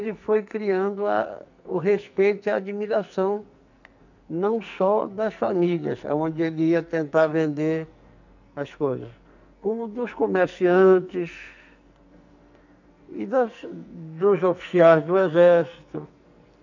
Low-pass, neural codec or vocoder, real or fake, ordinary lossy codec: 7.2 kHz; codec, 44.1 kHz, 2.6 kbps, SNAC; fake; none